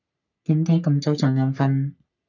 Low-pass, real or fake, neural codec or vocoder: 7.2 kHz; fake; codec, 44.1 kHz, 3.4 kbps, Pupu-Codec